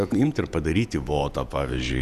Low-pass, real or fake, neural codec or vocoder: 14.4 kHz; fake; vocoder, 48 kHz, 128 mel bands, Vocos